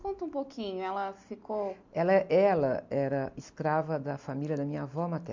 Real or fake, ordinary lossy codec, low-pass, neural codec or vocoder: real; MP3, 64 kbps; 7.2 kHz; none